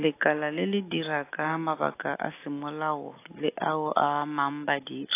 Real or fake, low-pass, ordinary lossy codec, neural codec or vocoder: real; 3.6 kHz; AAC, 24 kbps; none